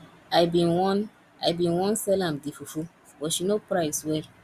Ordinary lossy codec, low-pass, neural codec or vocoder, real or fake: Opus, 64 kbps; 14.4 kHz; none; real